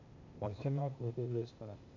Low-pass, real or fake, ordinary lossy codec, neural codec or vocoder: 7.2 kHz; fake; MP3, 64 kbps; codec, 16 kHz, 0.8 kbps, ZipCodec